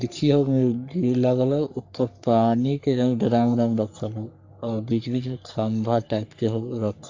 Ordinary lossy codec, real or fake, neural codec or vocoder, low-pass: AAC, 48 kbps; fake; codec, 44.1 kHz, 3.4 kbps, Pupu-Codec; 7.2 kHz